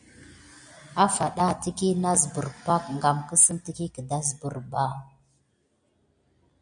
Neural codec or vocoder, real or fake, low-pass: none; real; 9.9 kHz